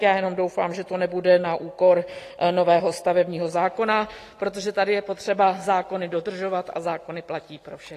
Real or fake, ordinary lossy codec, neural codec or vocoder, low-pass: real; AAC, 48 kbps; none; 14.4 kHz